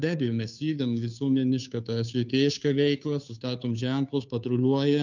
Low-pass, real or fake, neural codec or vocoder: 7.2 kHz; fake; codec, 16 kHz, 2 kbps, FunCodec, trained on Chinese and English, 25 frames a second